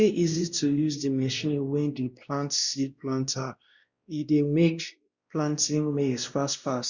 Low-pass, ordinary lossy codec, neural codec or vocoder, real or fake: 7.2 kHz; Opus, 64 kbps; codec, 16 kHz, 1 kbps, X-Codec, WavLM features, trained on Multilingual LibriSpeech; fake